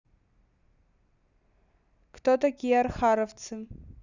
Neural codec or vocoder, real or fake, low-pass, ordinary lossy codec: none; real; 7.2 kHz; none